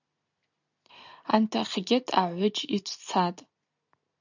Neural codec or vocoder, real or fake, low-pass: none; real; 7.2 kHz